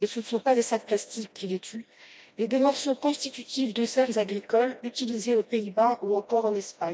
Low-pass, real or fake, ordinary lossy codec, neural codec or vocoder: none; fake; none; codec, 16 kHz, 1 kbps, FreqCodec, smaller model